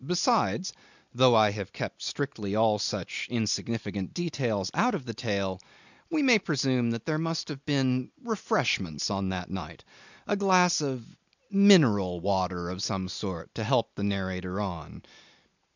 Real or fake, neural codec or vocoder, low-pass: real; none; 7.2 kHz